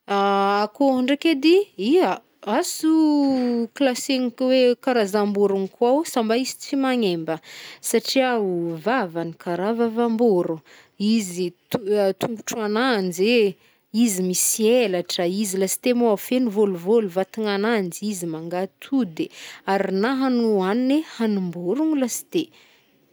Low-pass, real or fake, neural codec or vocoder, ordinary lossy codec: none; real; none; none